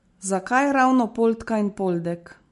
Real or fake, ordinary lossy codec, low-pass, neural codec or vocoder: real; MP3, 48 kbps; 14.4 kHz; none